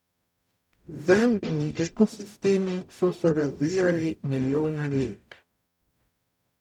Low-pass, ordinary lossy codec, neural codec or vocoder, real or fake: 19.8 kHz; none; codec, 44.1 kHz, 0.9 kbps, DAC; fake